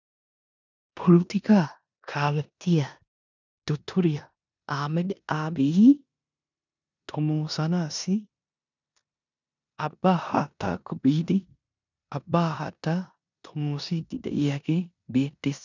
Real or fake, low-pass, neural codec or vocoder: fake; 7.2 kHz; codec, 16 kHz in and 24 kHz out, 0.9 kbps, LongCat-Audio-Codec, four codebook decoder